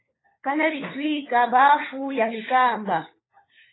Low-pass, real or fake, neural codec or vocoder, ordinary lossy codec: 7.2 kHz; fake; codec, 16 kHz, 4 kbps, FunCodec, trained on LibriTTS, 50 frames a second; AAC, 16 kbps